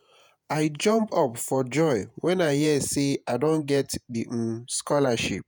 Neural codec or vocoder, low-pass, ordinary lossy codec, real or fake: vocoder, 48 kHz, 128 mel bands, Vocos; none; none; fake